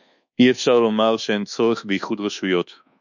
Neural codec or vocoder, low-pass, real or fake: codec, 24 kHz, 1.2 kbps, DualCodec; 7.2 kHz; fake